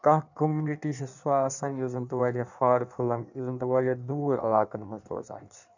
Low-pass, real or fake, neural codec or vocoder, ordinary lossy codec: 7.2 kHz; fake; codec, 16 kHz in and 24 kHz out, 1.1 kbps, FireRedTTS-2 codec; none